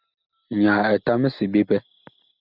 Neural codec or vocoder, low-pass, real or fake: none; 5.4 kHz; real